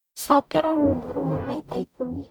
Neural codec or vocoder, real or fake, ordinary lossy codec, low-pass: codec, 44.1 kHz, 0.9 kbps, DAC; fake; none; 19.8 kHz